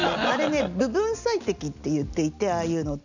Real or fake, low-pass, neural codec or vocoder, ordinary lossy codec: real; 7.2 kHz; none; none